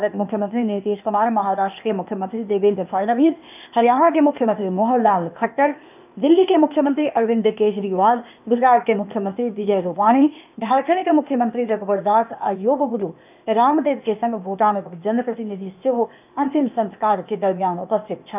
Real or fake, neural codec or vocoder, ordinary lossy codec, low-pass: fake; codec, 16 kHz, 0.8 kbps, ZipCodec; none; 3.6 kHz